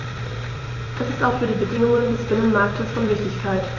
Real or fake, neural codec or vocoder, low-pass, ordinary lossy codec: fake; vocoder, 44.1 kHz, 128 mel bands every 256 samples, BigVGAN v2; 7.2 kHz; AAC, 32 kbps